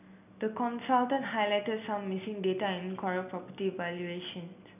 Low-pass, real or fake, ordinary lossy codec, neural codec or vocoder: 3.6 kHz; real; none; none